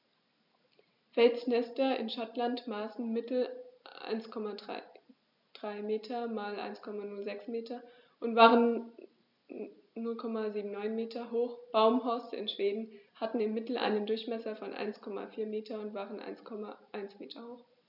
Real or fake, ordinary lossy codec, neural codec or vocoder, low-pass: real; none; none; 5.4 kHz